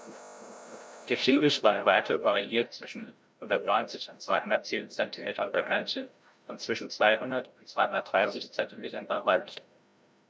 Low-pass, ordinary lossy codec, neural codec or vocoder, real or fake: none; none; codec, 16 kHz, 0.5 kbps, FreqCodec, larger model; fake